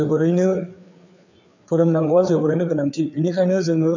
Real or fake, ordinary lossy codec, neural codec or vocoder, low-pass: fake; none; codec, 16 kHz, 4 kbps, FreqCodec, larger model; 7.2 kHz